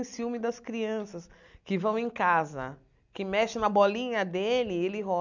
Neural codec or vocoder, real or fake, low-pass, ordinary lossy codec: none; real; 7.2 kHz; none